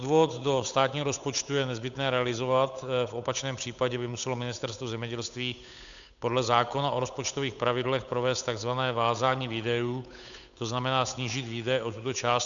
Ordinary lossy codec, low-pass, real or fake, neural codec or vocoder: MP3, 96 kbps; 7.2 kHz; fake; codec, 16 kHz, 8 kbps, FunCodec, trained on Chinese and English, 25 frames a second